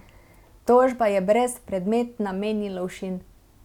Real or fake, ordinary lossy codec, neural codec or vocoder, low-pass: real; none; none; 19.8 kHz